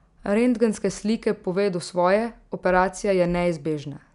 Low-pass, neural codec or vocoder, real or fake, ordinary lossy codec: 10.8 kHz; none; real; none